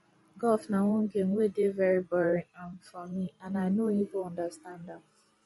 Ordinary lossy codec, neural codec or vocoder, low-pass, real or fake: MP3, 48 kbps; vocoder, 44.1 kHz, 128 mel bands every 512 samples, BigVGAN v2; 19.8 kHz; fake